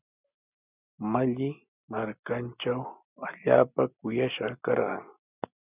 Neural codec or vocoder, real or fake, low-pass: none; real; 3.6 kHz